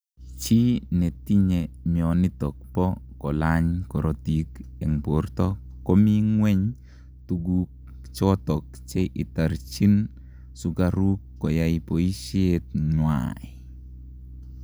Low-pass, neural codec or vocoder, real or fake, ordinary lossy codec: none; none; real; none